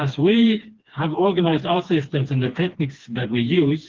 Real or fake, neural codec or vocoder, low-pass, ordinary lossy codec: fake; codec, 16 kHz, 2 kbps, FreqCodec, smaller model; 7.2 kHz; Opus, 16 kbps